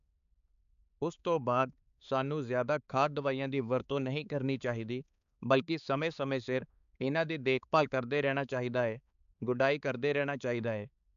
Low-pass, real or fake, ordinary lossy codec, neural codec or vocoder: 7.2 kHz; fake; none; codec, 16 kHz, 4 kbps, X-Codec, HuBERT features, trained on balanced general audio